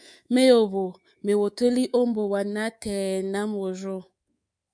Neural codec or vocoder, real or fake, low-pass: codec, 24 kHz, 3.1 kbps, DualCodec; fake; 9.9 kHz